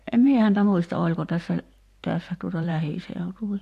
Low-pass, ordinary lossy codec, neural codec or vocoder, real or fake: 14.4 kHz; AAC, 64 kbps; vocoder, 44.1 kHz, 128 mel bands every 512 samples, BigVGAN v2; fake